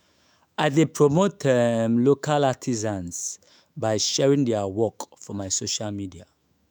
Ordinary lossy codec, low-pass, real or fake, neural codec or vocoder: none; none; fake; autoencoder, 48 kHz, 128 numbers a frame, DAC-VAE, trained on Japanese speech